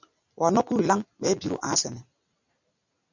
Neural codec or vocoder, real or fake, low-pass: none; real; 7.2 kHz